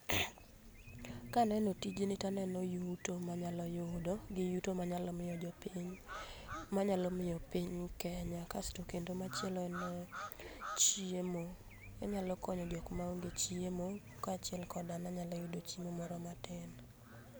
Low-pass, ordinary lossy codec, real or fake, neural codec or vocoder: none; none; real; none